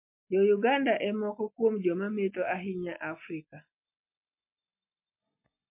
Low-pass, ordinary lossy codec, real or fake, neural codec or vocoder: 3.6 kHz; MP3, 32 kbps; real; none